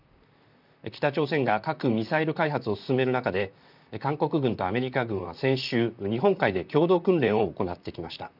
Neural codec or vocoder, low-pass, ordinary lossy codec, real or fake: vocoder, 44.1 kHz, 128 mel bands, Pupu-Vocoder; 5.4 kHz; none; fake